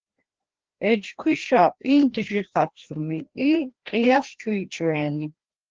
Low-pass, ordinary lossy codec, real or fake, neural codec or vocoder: 7.2 kHz; Opus, 16 kbps; fake; codec, 16 kHz, 1 kbps, FreqCodec, larger model